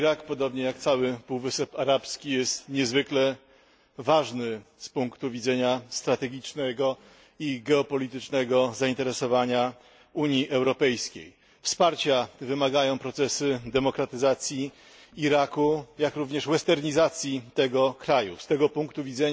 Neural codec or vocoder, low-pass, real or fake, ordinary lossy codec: none; none; real; none